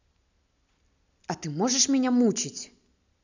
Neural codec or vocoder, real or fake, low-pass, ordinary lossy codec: none; real; 7.2 kHz; none